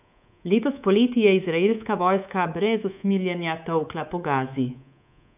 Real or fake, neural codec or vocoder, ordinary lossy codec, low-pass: fake; codec, 24 kHz, 3.1 kbps, DualCodec; none; 3.6 kHz